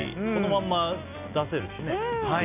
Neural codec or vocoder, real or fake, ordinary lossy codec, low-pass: none; real; none; 3.6 kHz